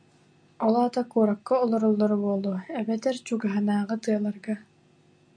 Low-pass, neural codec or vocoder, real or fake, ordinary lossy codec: 9.9 kHz; none; real; MP3, 64 kbps